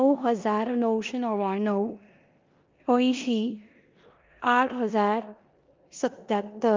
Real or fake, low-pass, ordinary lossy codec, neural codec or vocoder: fake; 7.2 kHz; Opus, 32 kbps; codec, 16 kHz in and 24 kHz out, 0.9 kbps, LongCat-Audio-Codec, four codebook decoder